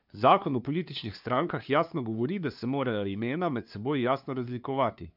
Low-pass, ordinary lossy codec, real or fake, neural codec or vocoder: 5.4 kHz; none; fake; codec, 16 kHz, 2 kbps, FunCodec, trained on LibriTTS, 25 frames a second